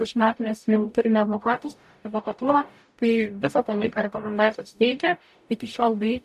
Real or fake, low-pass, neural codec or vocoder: fake; 14.4 kHz; codec, 44.1 kHz, 0.9 kbps, DAC